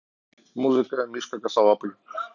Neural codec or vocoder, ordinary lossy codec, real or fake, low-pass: none; none; real; 7.2 kHz